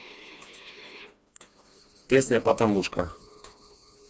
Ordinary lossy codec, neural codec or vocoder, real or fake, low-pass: none; codec, 16 kHz, 2 kbps, FreqCodec, smaller model; fake; none